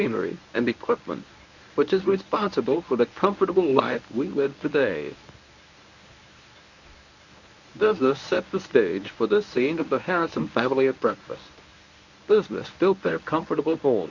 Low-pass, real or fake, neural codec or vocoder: 7.2 kHz; fake; codec, 24 kHz, 0.9 kbps, WavTokenizer, medium speech release version 1